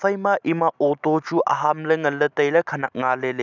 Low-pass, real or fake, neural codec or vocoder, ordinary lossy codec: 7.2 kHz; real; none; none